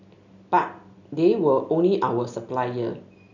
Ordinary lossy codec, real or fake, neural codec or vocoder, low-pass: none; real; none; 7.2 kHz